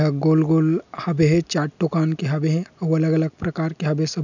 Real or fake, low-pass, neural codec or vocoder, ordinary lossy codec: real; 7.2 kHz; none; none